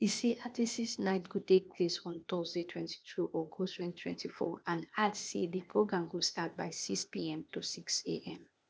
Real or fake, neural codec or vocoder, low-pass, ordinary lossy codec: fake; codec, 16 kHz, 0.8 kbps, ZipCodec; none; none